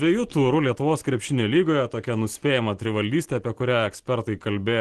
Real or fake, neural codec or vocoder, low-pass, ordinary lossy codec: real; none; 10.8 kHz; Opus, 16 kbps